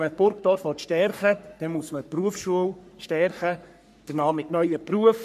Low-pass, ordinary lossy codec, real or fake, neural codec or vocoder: 14.4 kHz; none; fake; codec, 44.1 kHz, 3.4 kbps, Pupu-Codec